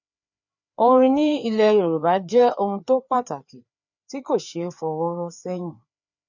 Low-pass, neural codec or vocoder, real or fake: 7.2 kHz; codec, 16 kHz, 4 kbps, FreqCodec, larger model; fake